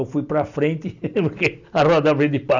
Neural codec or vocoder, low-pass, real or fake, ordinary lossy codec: none; 7.2 kHz; real; none